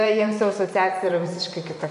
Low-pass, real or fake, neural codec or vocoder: 10.8 kHz; fake; vocoder, 24 kHz, 100 mel bands, Vocos